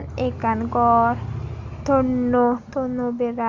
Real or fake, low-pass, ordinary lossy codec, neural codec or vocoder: real; 7.2 kHz; Opus, 64 kbps; none